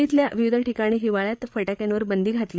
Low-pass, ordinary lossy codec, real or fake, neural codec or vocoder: none; none; fake; codec, 16 kHz, 8 kbps, FreqCodec, larger model